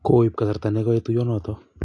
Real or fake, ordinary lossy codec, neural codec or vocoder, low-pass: real; AAC, 48 kbps; none; 10.8 kHz